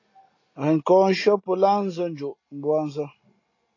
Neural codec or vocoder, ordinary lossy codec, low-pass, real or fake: none; AAC, 32 kbps; 7.2 kHz; real